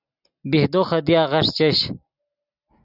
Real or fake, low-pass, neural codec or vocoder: real; 5.4 kHz; none